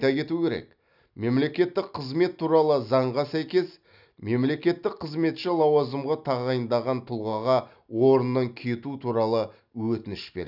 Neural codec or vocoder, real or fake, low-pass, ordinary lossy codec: none; real; 5.4 kHz; none